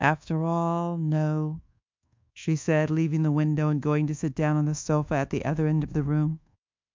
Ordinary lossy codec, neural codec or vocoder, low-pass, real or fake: MP3, 64 kbps; codec, 24 kHz, 1.2 kbps, DualCodec; 7.2 kHz; fake